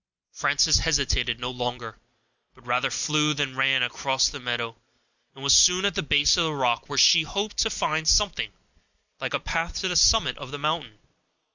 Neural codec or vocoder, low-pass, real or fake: none; 7.2 kHz; real